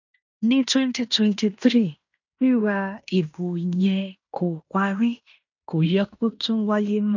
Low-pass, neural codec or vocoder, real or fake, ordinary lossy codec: 7.2 kHz; codec, 16 kHz in and 24 kHz out, 0.9 kbps, LongCat-Audio-Codec, fine tuned four codebook decoder; fake; none